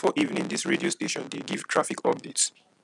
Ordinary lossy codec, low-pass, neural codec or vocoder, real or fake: none; 10.8 kHz; none; real